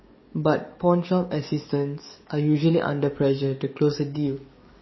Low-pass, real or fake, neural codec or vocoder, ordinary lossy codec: 7.2 kHz; fake; codec, 44.1 kHz, 7.8 kbps, DAC; MP3, 24 kbps